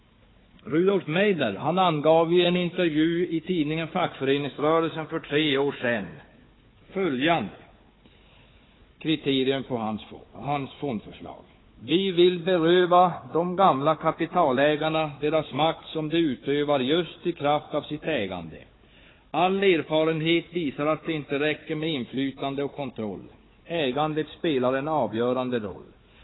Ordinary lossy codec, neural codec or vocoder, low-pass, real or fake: AAC, 16 kbps; codec, 16 kHz, 4 kbps, FunCodec, trained on Chinese and English, 50 frames a second; 7.2 kHz; fake